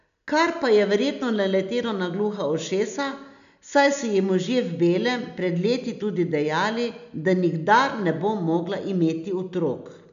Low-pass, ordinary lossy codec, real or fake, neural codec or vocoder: 7.2 kHz; MP3, 96 kbps; real; none